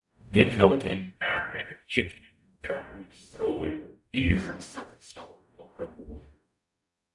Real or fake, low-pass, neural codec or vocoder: fake; 10.8 kHz; codec, 44.1 kHz, 0.9 kbps, DAC